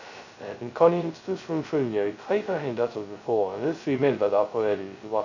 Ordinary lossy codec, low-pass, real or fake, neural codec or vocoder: none; 7.2 kHz; fake; codec, 16 kHz, 0.2 kbps, FocalCodec